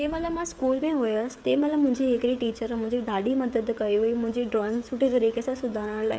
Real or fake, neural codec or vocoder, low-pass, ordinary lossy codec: fake; codec, 16 kHz, 16 kbps, FreqCodec, smaller model; none; none